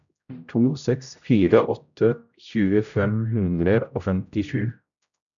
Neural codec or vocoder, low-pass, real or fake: codec, 16 kHz, 0.5 kbps, X-Codec, HuBERT features, trained on general audio; 7.2 kHz; fake